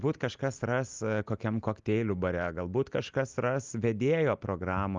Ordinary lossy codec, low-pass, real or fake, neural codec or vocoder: Opus, 32 kbps; 7.2 kHz; real; none